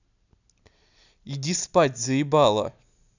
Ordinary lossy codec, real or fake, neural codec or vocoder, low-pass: none; real; none; 7.2 kHz